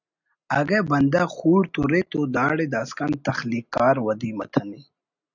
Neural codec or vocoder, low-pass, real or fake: none; 7.2 kHz; real